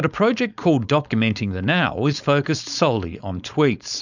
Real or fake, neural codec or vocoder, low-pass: fake; codec, 16 kHz, 4.8 kbps, FACodec; 7.2 kHz